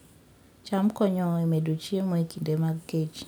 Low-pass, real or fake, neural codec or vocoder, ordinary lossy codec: none; real; none; none